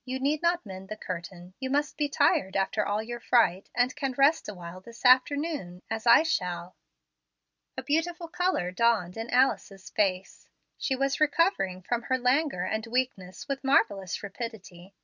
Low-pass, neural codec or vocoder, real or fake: 7.2 kHz; none; real